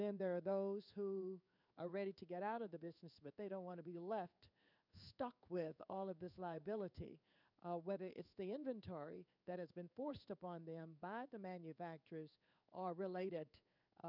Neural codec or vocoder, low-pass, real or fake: codec, 16 kHz in and 24 kHz out, 1 kbps, XY-Tokenizer; 5.4 kHz; fake